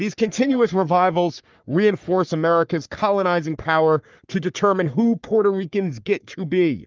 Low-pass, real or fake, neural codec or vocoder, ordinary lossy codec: 7.2 kHz; fake; codec, 44.1 kHz, 3.4 kbps, Pupu-Codec; Opus, 32 kbps